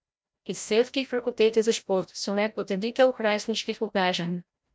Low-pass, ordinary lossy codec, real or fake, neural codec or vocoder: none; none; fake; codec, 16 kHz, 0.5 kbps, FreqCodec, larger model